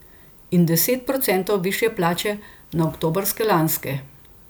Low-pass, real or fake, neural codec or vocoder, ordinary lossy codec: none; real; none; none